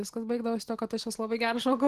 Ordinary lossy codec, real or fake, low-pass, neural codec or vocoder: Opus, 16 kbps; real; 14.4 kHz; none